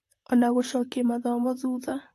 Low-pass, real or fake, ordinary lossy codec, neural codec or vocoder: 14.4 kHz; fake; AAC, 64 kbps; vocoder, 44.1 kHz, 128 mel bands, Pupu-Vocoder